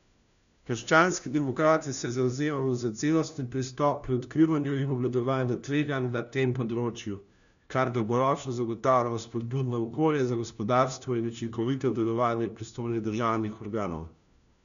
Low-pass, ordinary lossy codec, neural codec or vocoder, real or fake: 7.2 kHz; none; codec, 16 kHz, 1 kbps, FunCodec, trained on LibriTTS, 50 frames a second; fake